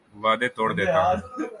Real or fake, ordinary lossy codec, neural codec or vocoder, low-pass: real; MP3, 96 kbps; none; 10.8 kHz